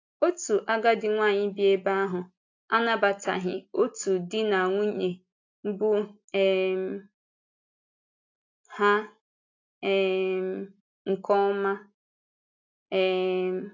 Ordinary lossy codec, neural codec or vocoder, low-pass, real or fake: AAC, 48 kbps; none; 7.2 kHz; real